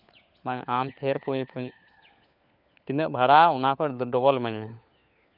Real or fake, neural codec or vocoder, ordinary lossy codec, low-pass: fake; codec, 16 kHz, 4 kbps, FunCodec, trained on LibriTTS, 50 frames a second; none; 5.4 kHz